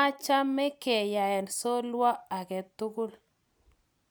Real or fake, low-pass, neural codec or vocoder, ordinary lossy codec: real; none; none; none